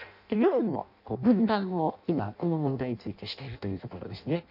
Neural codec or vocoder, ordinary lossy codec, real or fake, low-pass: codec, 16 kHz in and 24 kHz out, 0.6 kbps, FireRedTTS-2 codec; none; fake; 5.4 kHz